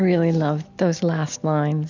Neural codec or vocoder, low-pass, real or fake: none; 7.2 kHz; real